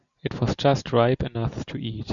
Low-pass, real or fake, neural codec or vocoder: 7.2 kHz; real; none